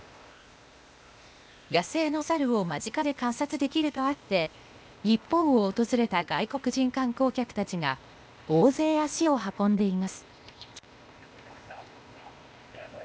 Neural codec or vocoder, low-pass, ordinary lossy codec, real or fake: codec, 16 kHz, 0.8 kbps, ZipCodec; none; none; fake